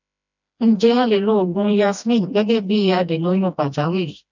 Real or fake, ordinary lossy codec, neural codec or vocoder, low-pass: fake; none; codec, 16 kHz, 1 kbps, FreqCodec, smaller model; 7.2 kHz